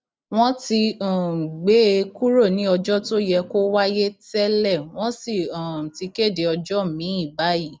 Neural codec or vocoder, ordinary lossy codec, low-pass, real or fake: none; none; none; real